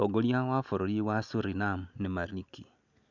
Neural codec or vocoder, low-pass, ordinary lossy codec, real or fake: none; 7.2 kHz; none; real